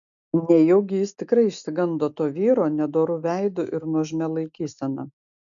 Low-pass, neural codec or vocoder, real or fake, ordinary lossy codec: 7.2 kHz; none; real; MP3, 96 kbps